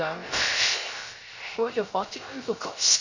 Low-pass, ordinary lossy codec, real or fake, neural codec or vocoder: 7.2 kHz; Opus, 64 kbps; fake; codec, 16 kHz, 0.3 kbps, FocalCodec